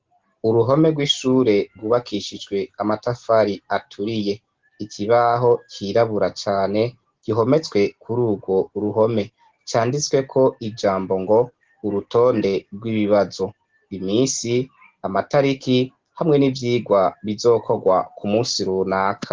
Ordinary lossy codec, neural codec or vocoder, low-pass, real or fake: Opus, 16 kbps; none; 7.2 kHz; real